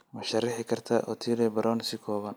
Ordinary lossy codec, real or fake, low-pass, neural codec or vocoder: none; real; none; none